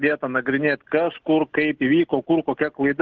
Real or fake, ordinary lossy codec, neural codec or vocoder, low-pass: real; Opus, 16 kbps; none; 7.2 kHz